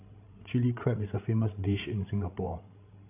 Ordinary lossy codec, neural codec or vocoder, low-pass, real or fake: AAC, 24 kbps; codec, 16 kHz, 16 kbps, FreqCodec, larger model; 3.6 kHz; fake